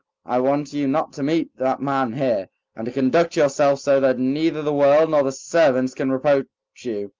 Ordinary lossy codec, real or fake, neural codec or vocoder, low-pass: Opus, 32 kbps; real; none; 7.2 kHz